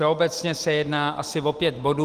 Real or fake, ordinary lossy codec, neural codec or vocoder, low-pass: real; Opus, 24 kbps; none; 14.4 kHz